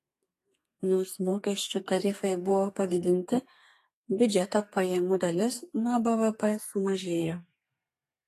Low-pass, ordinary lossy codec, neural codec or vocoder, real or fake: 14.4 kHz; AAC, 48 kbps; codec, 32 kHz, 1.9 kbps, SNAC; fake